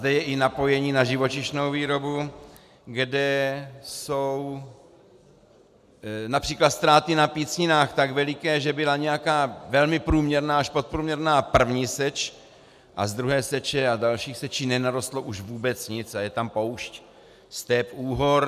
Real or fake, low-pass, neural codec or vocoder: real; 14.4 kHz; none